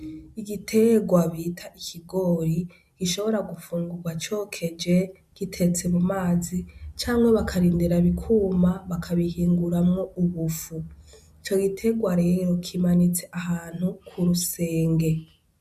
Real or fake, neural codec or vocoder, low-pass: real; none; 14.4 kHz